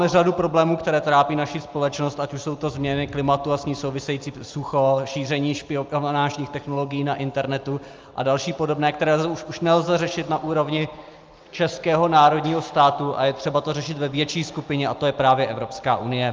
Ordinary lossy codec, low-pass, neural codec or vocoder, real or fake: Opus, 24 kbps; 7.2 kHz; none; real